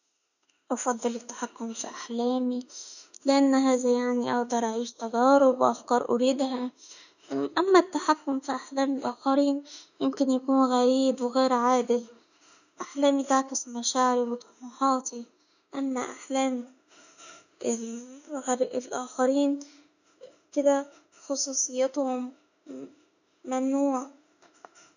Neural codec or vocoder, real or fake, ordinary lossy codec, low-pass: autoencoder, 48 kHz, 32 numbers a frame, DAC-VAE, trained on Japanese speech; fake; none; 7.2 kHz